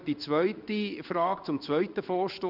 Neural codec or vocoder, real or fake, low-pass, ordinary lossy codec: codec, 16 kHz in and 24 kHz out, 1 kbps, XY-Tokenizer; fake; 5.4 kHz; none